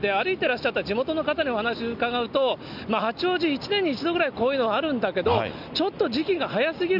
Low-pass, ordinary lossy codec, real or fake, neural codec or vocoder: 5.4 kHz; none; real; none